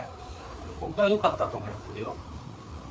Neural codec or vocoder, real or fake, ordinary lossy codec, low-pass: codec, 16 kHz, 4 kbps, FreqCodec, larger model; fake; none; none